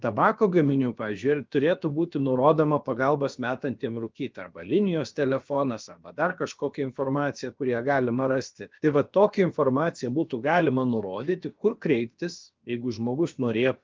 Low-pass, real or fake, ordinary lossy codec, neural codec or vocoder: 7.2 kHz; fake; Opus, 32 kbps; codec, 16 kHz, about 1 kbps, DyCAST, with the encoder's durations